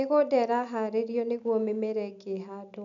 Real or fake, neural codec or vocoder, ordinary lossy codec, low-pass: real; none; none; 7.2 kHz